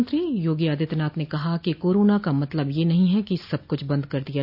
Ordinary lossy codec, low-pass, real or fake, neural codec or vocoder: none; 5.4 kHz; real; none